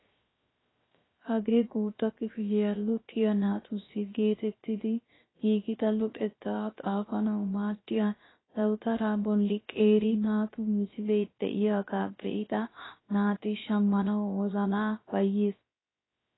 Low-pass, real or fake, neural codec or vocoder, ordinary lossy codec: 7.2 kHz; fake; codec, 16 kHz, 0.3 kbps, FocalCodec; AAC, 16 kbps